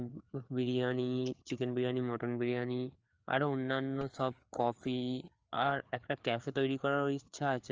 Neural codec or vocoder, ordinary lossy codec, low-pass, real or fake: codec, 16 kHz, 4 kbps, FreqCodec, larger model; Opus, 24 kbps; 7.2 kHz; fake